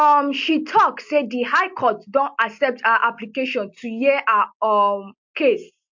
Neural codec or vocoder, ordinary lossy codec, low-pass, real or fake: none; MP3, 48 kbps; 7.2 kHz; real